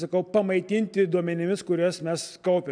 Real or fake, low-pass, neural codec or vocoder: real; 9.9 kHz; none